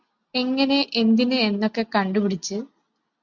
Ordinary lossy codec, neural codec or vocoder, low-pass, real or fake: MP3, 64 kbps; none; 7.2 kHz; real